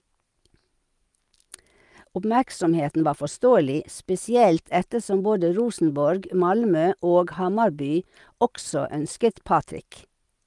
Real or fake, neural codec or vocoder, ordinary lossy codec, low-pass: real; none; Opus, 32 kbps; 10.8 kHz